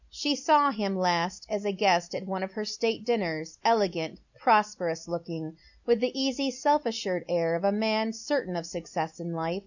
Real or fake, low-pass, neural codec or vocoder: real; 7.2 kHz; none